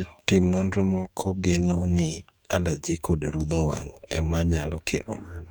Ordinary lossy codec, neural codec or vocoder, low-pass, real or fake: none; codec, 44.1 kHz, 2.6 kbps, DAC; 19.8 kHz; fake